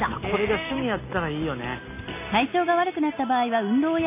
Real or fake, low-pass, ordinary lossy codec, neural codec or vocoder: real; 3.6 kHz; MP3, 24 kbps; none